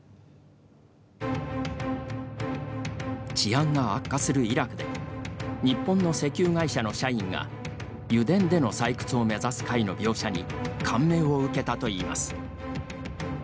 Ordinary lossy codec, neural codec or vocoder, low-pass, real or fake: none; none; none; real